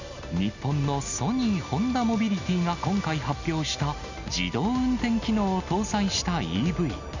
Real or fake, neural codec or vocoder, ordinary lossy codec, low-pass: real; none; none; 7.2 kHz